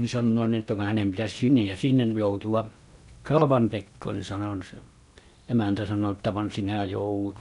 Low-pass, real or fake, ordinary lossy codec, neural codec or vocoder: 10.8 kHz; fake; none; codec, 16 kHz in and 24 kHz out, 0.8 kbps, FocalCodec, streaming, 65536 codes